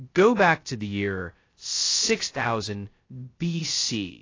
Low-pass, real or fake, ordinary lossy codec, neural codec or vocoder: 7.2 kHz; fake; AAC, 32 kbps; codec, 16 kHz, 0.2 kbps, FocalCodec